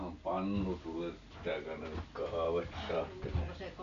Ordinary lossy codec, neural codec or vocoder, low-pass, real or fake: AAC, 96 kbps; none; 7.2 kHz; real